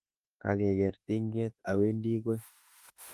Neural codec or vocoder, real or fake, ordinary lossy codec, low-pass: autoencoder, 48 kHz, 32 numbers a frame, DAC-VAE, trained on Japanese speech; fake; Opus, 24 kbps; 14.4 kHz